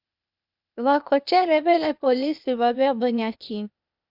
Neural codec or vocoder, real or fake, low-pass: codec, 16 kHz, 0.8 kbps, ZipCodec; fake; 5.4 kHz